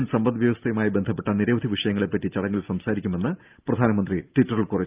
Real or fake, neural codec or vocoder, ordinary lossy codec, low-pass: real; none; Opus, 24 kbps; 3.6 kHz